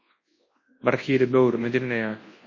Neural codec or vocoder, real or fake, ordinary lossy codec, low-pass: codec, 24 kHz, 0.9 kbps, WavTokenizer, large speech release; fake; MP3, 32 kbps; 7.2 kHz